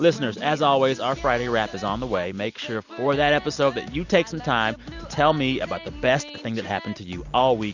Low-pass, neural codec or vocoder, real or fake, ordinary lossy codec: 7.2 kHz; none; real; Opus, 64 kbps